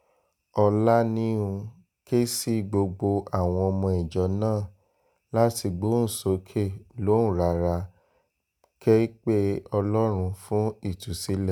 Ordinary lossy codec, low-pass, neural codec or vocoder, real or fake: none; none; none; real